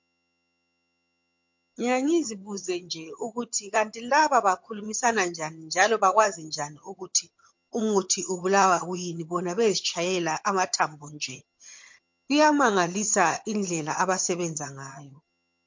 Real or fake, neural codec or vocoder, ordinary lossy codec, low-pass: fake; vocoder, 22.05 kHz, 80 mel bands, HiFi-GAN; MP3, 48 kbps; 7.2 kHz